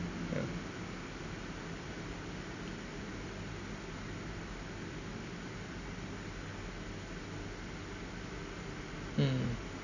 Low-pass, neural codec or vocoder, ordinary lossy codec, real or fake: 7.2 kHz; none; none; real